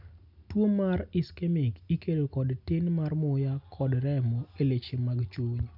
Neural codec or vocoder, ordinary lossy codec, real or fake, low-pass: none; none; real; 5.4 kHz